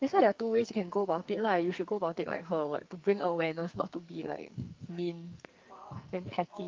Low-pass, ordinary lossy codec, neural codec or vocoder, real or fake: 7.2 kHz; Opus, 24 kbps; codec, 44.1 kHz, 2.6 kbps, SNAC; fake